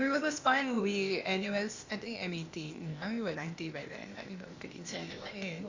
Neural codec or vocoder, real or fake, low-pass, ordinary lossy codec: codec, 16 kHz, 0.8 kbps, ZipCodec; fake; 7.2 kHz; Opus, 64 kbps